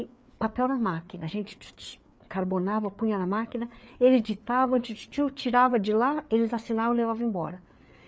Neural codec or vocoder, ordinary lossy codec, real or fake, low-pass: codec, 16 kHz, 4 kbps, FreqCodec, larger model; none; fake; none